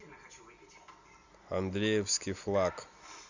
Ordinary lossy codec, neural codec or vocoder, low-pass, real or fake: Opus, 64 kbps; none; 7.2 kHz; real